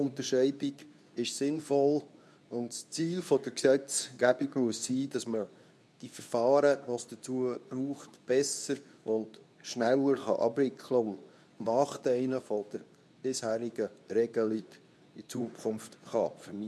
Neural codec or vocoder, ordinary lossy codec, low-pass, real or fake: codec, 24 kHz, 0.9 kbps, WavTokenizer, medium speech release version 2; none; none; fake